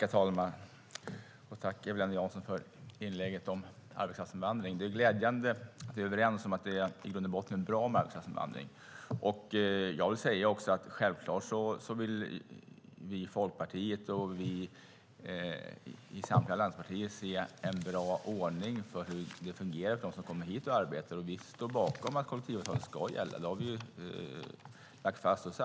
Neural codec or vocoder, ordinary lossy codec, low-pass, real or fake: none; none; none; real